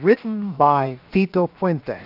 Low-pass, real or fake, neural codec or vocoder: 5.4 kHz; fake; codec, 16 kHz, 0.7 kbps, FocalCodec